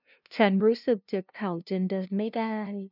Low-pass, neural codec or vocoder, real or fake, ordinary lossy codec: 5.4 kHz; codec, 16 kHz, 0.5 kbps, FunCodec, trained on LibriTTS, 25 frames a second; fake; none